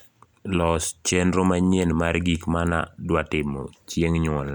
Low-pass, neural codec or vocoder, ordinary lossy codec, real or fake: 19.8 kHz; none; none; real